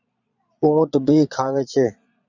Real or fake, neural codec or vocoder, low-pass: fake; vocoder, 22.05 kHz, 80 mel bands, Vocos; 7.2 kHz